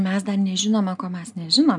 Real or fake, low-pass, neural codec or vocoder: real; 10.8 kHz; none